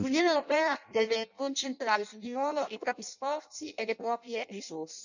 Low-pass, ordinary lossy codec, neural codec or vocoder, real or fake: 7.2 kHz; none; codec, 16 kHz in and 24 kHz out, 0.6 kbps, FireRedTTS-2 codec; fake